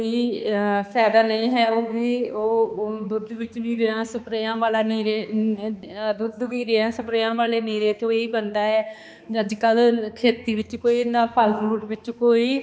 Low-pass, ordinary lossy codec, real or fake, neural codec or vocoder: none; none; fake; codec, 16 kHz, 2 kbps, X-Codec, HuBERT features, trained on balanced general audio